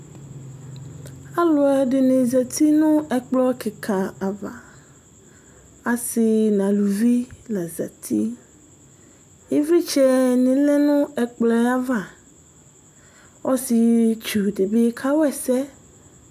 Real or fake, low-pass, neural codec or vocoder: real; 14.4 kHz; none